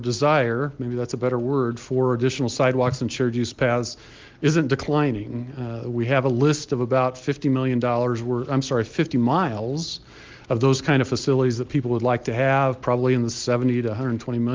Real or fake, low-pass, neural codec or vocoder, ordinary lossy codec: real; 7.2 kHz; none; Opus, 32 kbps